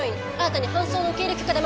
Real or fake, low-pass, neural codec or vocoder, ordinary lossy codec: real; none; none; none